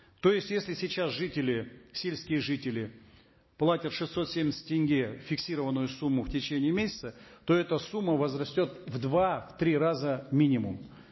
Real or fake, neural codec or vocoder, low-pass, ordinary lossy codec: real; none; 7.2 kHz; MP3, 24 kbps